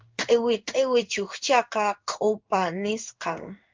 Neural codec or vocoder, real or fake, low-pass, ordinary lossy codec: codec, 16 kHz in and 24 kHz out, 1 kbps, XY-Tokenizer; fake; 7.2 kHz; Opus, 24 kbps